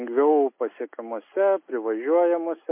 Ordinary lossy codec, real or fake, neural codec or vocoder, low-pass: MP3, 24 kbps; real; none; 3.6 kHz